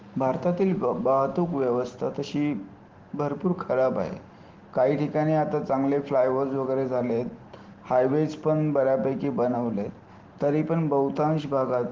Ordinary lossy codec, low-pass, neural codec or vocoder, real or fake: Opus, 16 kbps; 7.2 kHz; none; real